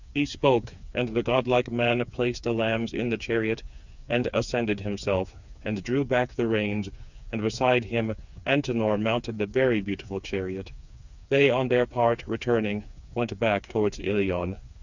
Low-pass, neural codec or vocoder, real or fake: 7.2 kHz; codec, 16 kHz, 4 kbps, FreqCodec, smaller model; fake